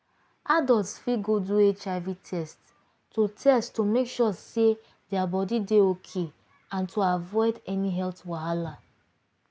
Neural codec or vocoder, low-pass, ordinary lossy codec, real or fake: none; none; none; real